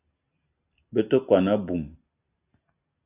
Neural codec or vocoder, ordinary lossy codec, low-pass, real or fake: none; AAC, 32 kbps; 3.6 kHz; real